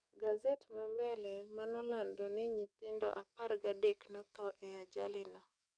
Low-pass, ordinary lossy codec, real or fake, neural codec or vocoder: 9.9 kHz; none; fake; codec, 44.1 kHz, 7.8 kbps, DAC